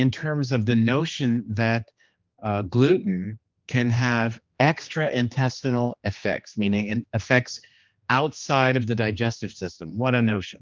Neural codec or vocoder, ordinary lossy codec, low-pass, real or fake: codec, 16 kHz, 2 kbps, X-Codec, HuBERT features, trained on general audio; Opus, 24 kbps; 7.2 kHz; fake